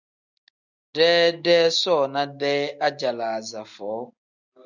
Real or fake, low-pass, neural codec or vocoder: real; 7.2 kHz; none